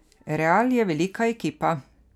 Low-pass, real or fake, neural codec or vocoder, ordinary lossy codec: 19.8 kHz; real; none; none